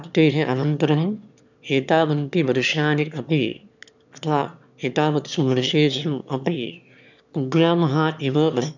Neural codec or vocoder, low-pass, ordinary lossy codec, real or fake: autoencoder, 22.05 kHz, a latent of 192 numbers a frame, VITS, trained on one speaker; 7.2 kHz; none; fake